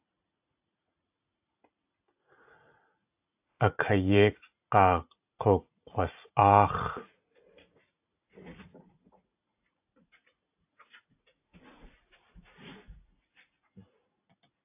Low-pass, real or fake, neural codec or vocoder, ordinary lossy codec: 3.6 kHz; fake; vocoder, 44.1 kHz, 80 mel bands, Vocos; AAC, 32 kbps